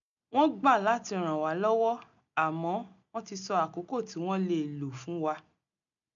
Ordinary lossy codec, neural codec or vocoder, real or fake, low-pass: none; none; real; 7.2 kHz